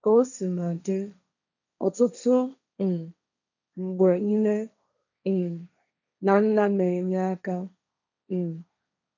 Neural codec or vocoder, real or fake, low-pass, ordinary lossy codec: codec, 16 kHz, 1.1 kbps, Voila-Tokenizer; fake; 7.2 kHz; none